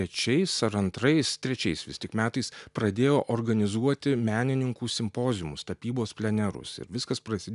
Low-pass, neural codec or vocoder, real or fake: 10.8 kHz; none; real